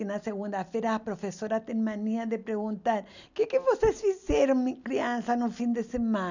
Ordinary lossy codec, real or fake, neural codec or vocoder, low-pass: none; real; none; 7.2 kHz